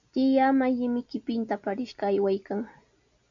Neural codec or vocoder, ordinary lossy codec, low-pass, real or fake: none; MP3, 96 kbps; 7.2 kHz; real